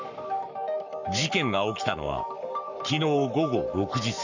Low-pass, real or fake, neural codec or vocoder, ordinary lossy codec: 7.2 kHz; fake; codec, 44.1 kHz, 7.8 kbps, Pupu-Codec; none